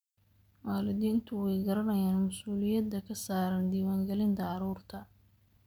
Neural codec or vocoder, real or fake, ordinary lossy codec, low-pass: none; real; none; none